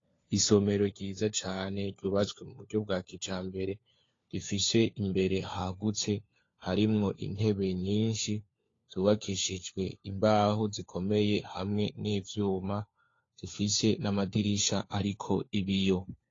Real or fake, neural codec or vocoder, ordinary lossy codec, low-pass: fake; codec, 16 kHz, 4 kbps, FunCodec, trained on LibriTTS, 50 frames a second; AAC, 32 kbps; 7.2 kHz